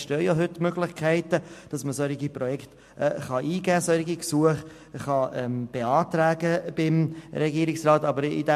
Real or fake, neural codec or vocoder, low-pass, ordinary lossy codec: real; none; 14.4 kHz; MP3, 64 kbps